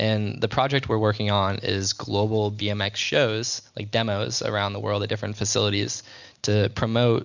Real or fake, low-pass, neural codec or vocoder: real; 7.2 kHz; none